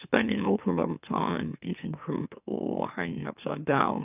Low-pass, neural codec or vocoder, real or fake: 3.6 kHz; autoencoder, 44.1 kHz, a latent of 192 numbers a frame, MeloTTS; fake